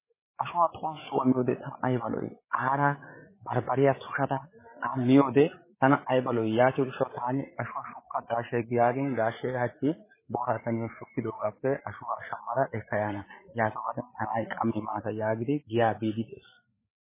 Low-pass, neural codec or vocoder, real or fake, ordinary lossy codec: 3.6 kHz; codec, 16 kHz, 8 kbps, FreqCodec, larger model; fake; MP3, 16 kbps